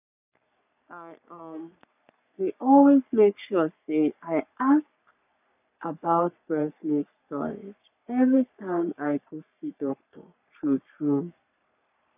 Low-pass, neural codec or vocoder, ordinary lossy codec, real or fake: 3.6 kHz; codec, 44.1 kHz, 3.4 kbps, Pupu-Codec; none; fake